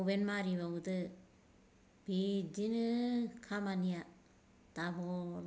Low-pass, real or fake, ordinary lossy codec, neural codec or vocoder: none; real; none; none